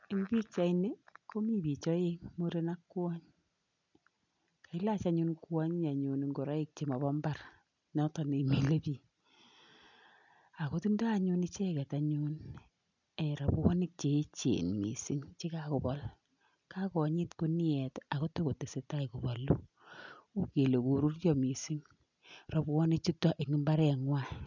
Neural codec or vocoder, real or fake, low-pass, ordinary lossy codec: none; real; 7.2 kHz; none